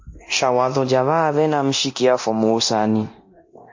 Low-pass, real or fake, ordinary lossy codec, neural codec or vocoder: 7.2 kHz; fake; MP3, 32 kbps; codec, 24 kHz, 0.9 kbps, DualCodec